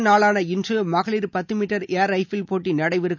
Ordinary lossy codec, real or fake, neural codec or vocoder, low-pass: none; real; none; 7.2 kHz